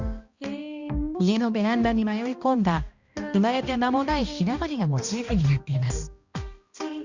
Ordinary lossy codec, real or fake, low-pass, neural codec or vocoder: Opus, 64 kbps; fake; 7.2 kHz; codec, 16 kHz, 1 kbps, X-Codec, HuBERT features, trained on balanced general audio